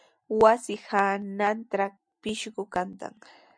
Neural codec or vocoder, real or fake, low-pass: none; real; 9.9 kHz